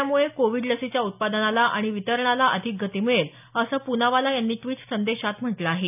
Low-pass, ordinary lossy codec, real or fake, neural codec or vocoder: 3.6 kHz; none; real; none